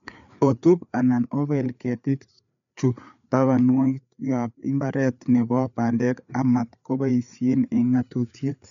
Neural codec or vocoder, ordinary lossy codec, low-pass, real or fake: codec, 16 kHz, 4 kbps, FreqCodec, larger model; none; 7.2 kHz; fake